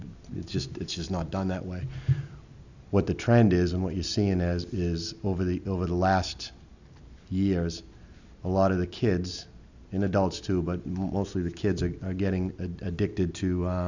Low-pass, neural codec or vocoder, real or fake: 7.2 kHz; none; real